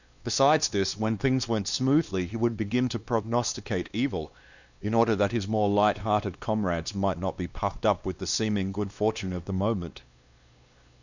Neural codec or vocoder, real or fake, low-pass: codec, 16 kHz, 2 kbps, X-Codec, WavLM features, trained on Multilingual LibriSpeech; fake; 7.2 kHz